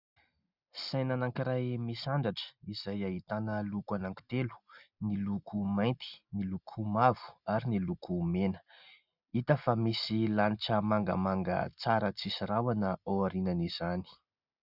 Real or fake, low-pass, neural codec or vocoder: real; 5.4 kHz; none